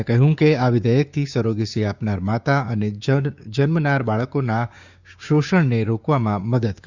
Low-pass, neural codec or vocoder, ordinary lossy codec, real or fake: 7.2 kHz; codec, 16 kHz, 6 kbps, DAC; Opus, 64 kbps; fake